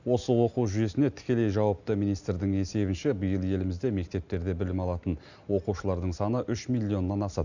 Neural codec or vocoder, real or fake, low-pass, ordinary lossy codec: none; real; 7.2 kHz; none